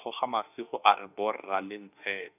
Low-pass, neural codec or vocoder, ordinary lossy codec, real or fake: 3.6 kHz; autoencoder, 48 kHz, 32 numbers a frame, DAC-VAE, trained on Japanese speech; none; fake